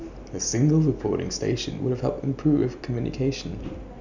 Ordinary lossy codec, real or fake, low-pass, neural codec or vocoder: none; real; 7.2 kHz; none